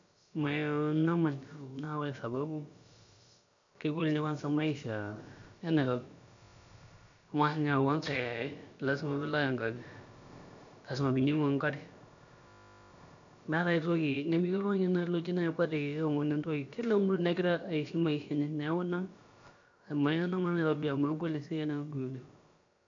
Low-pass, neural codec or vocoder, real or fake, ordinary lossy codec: 7.2 kHz; codec, 16 kHz, about 1 kbps, DyCAST, with the encoder's durations; fake; MP3, 64 kbps